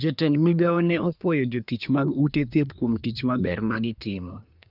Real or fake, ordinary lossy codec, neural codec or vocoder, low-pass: fake; none; codec, 24 kHz, 1 kbps, SNAC; 5.4 kHz